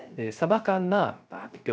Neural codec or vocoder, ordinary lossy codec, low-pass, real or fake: codec, 16 kHz, 0.3 kbps, FocalCodec; none; none; fake